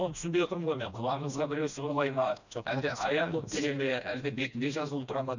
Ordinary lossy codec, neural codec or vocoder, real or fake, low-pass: none; codec, 16 kHz, 1 kbps, FreqCodec, smaller model; fake; 7.2 kHz